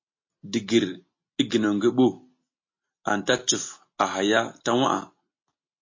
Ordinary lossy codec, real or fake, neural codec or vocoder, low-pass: MP3, 32 kbps; real; none; 7.2 kHz